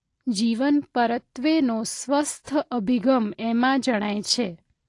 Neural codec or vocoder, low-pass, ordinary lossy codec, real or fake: none; 10.8 kHz; AAC, 48 kbps; real